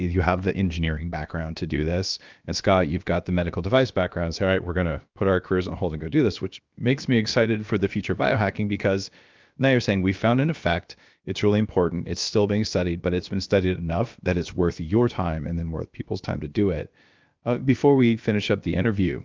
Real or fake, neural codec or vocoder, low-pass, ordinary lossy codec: fake; codec, 16 kHz, about 1 kbps, DyCAST, with the encoder's durations; 7.2 kHz; Opus, 24 kbps